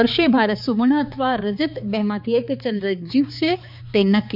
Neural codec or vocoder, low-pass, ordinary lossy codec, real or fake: codec, 16 kHz, 4 kbps, X-Codec, HuBERT features, trained on balanced general audio; 5.4 kHz; none; fake